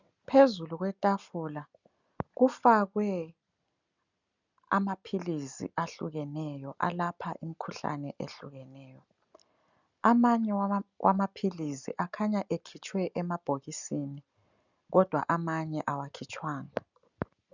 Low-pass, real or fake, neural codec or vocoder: 7.2 kHz; real; none